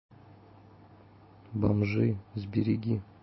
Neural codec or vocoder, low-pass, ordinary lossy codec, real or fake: none; 7.2 kHz; MP3, 24 kbps; real